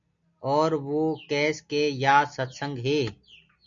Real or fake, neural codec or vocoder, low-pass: real; none; 7.2 kHz